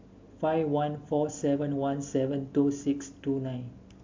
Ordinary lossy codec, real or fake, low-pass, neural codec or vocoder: MP3, 64 kbps; real; 7.2 kHz; none